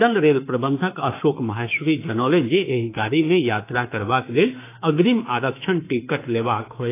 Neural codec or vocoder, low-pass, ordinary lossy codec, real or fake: autoencoder, 48 kHz, 32 numbers a frame, DAC-VAE, trained on Japanese speech; 3.6 kHz; AAC, 24 kbps; fake